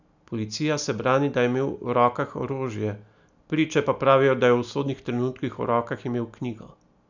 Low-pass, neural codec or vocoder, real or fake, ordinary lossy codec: 7.2 kHz; none; real; none